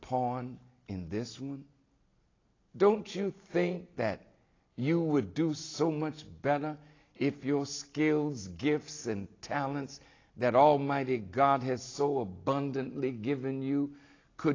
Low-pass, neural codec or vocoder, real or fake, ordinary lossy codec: 7.2 kHz; none; real; AAC, 32 kbps